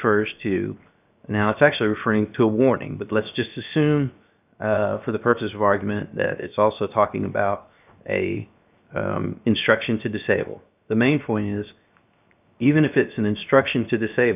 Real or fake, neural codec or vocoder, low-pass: fake; codec, 16 kHz, 0.7 kbps, FocalCodec; 3.6 kHz